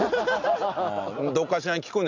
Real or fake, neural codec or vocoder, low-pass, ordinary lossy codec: real; none; 7.2 kHz; none